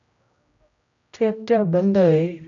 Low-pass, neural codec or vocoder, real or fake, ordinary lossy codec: 7.2 kHz; codec, 16 kHz, 0.5 kbps, X-Codec, HuBERT features, trained on general audio; fake; MP3, 64 kbps